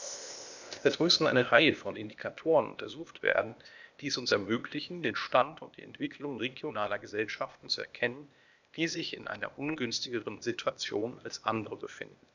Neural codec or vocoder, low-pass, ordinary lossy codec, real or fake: codec, 16 kHz, 0.8 kbps, ZipCodec; 7.2 kHz; none; fake